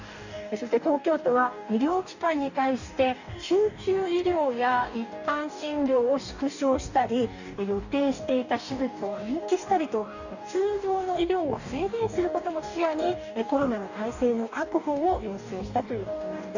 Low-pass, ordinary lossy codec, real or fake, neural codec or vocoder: 7.2 kHz; none; fake; codec, 44.1 kHz, 2.6 kbps, DAC